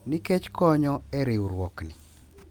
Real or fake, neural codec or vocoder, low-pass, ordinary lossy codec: real; none; 19.8 kHz; Opus, 32 kbps